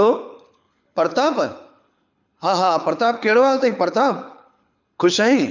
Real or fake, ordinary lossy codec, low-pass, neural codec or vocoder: fake; none; 7.2 kHz; codec, 24 kHz, 6 kbps, HILCodec